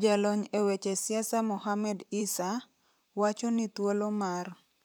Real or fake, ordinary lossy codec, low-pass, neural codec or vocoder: fake; none; none; vocoder, 44.1 kHz, 128 mel bands, Pupu-Vocoder